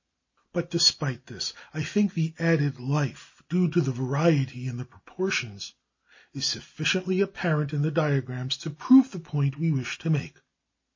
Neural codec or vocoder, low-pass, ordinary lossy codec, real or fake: none; 7.2 kHz; MP3, 32 kbps; real